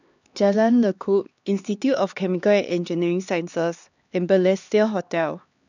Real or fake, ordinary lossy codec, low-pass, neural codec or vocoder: fake; none; 7.2 kHz; codec, 16 kHz, 2 kbps, X-Codec, HuBERT features, trained on LibriSpeech